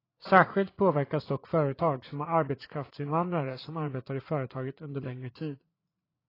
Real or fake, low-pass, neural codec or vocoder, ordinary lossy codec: fake; 5.4 kHz; vocoder, 44.1 kHz, 80 mel bands, Vocos; AAC, 24 kbps